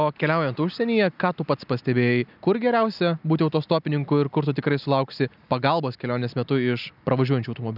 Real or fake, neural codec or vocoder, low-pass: real; none; 5.4 kHz